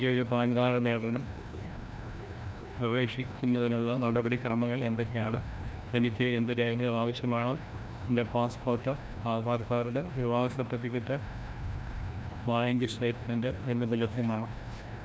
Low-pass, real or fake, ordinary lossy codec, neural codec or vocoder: none; fake; none; codec, 16 kHz, 1 kbps, FreqCodec, larger model